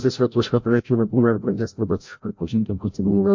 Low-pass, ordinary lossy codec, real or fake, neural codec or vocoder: 7.2 kHz; MP3, 48 kbps; fake; codec, 16 kHz, 0.5 kbps, FreqCodec, larger model